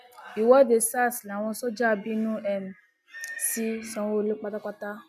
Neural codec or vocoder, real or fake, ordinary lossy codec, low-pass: none; real; none; 14.4 kHz